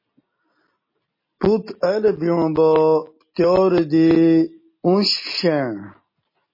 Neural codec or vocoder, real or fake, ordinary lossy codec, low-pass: none; real; MP3, 24 kbps; 5.4 kHz